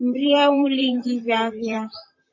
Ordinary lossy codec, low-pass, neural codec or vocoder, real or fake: MP3, 32 kbps; 7.2 kHz; vocoder, 44.1 kHz, 128 mel bands, Pupu-Vocoder; fake